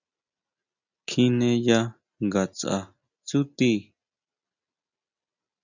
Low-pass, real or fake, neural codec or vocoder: 7.2 kHz; real; none